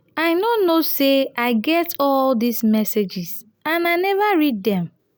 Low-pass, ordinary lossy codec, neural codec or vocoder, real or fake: none; none; none; real